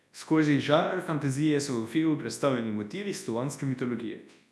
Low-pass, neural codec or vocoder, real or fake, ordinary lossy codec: none; codec, 24 kHz, 0.9 kbps, WavTokenizer, large speech release; fake; none